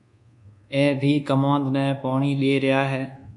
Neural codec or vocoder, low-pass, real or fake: codec, 24 kHz, 1.2 kbps, DualCodec; 10.8 kHz; fake